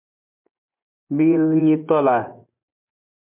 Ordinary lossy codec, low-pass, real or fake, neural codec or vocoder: MP3, 32 kbps; 3.6 kHz; fake; vocoder, 24 kHz, 100 mel bands, Vocos